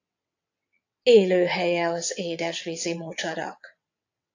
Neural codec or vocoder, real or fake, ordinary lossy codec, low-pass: vocoder, 22.05 kHz, 80 mel bands, WaveNeXt; fake; AAC, 48 kbps; 7.2 kHz